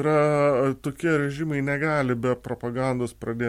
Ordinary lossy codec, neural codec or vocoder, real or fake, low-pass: MP3, 64 kbps; none; real; 19.8 kHz